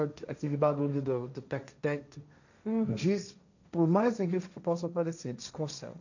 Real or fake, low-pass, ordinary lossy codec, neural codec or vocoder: fake; 7.2 kHz; none; codec, 16 kHz, 1.1 kbps, Voila-Tokenizer